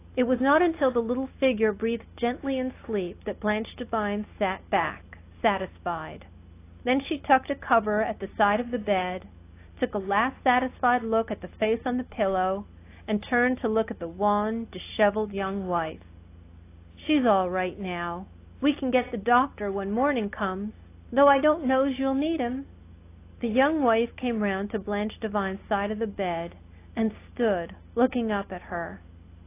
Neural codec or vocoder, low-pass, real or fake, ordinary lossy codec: none; 3.6 kHz; real; AAC, 24 kbps